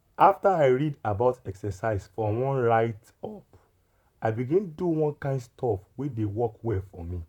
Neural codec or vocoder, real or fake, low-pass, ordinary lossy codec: vocoder, 44.1 kHz, 128 mel bands, Pupu-Vocoder; fake; 19.8 kHz; none